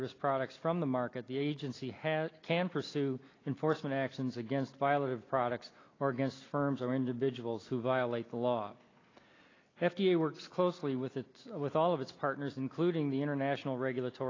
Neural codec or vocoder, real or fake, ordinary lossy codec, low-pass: none; real; AAC, 32 kbps; 7.2 kHz